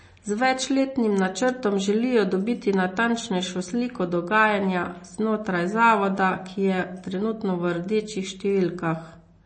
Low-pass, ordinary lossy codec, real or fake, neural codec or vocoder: 9.9 kHz; MP3, 32 kbps; real; none